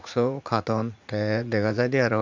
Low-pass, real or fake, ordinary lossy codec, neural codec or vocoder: 7.2 kHz; fake; MP3, 64 kbps; vocoder, 44.1 kHz, 80 mel bands, Vocos